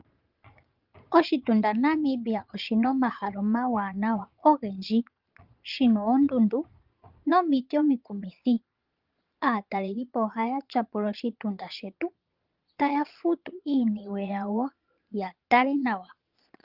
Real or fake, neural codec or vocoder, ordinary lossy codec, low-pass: fake; vocoder, 22.05 kHz, 80 mel bands, Vocos; Opus, 24 kbps; 5.4 kHz